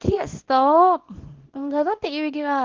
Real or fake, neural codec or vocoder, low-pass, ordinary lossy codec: fake; codec, 24 kHz, 0.9 kbps, WavTokenizer, small release; 7.2 kHz; Opus, 16 kbps